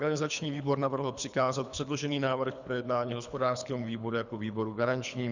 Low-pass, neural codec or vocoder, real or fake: 7.2 kHz; codec, 24 kHz, 3 kbps, HILCodec; fake